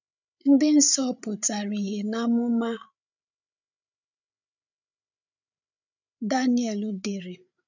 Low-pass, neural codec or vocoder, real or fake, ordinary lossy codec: 7.2 kHz; codec, 16 kHz, 8 kbps, FreqCodec, larger model; fake; none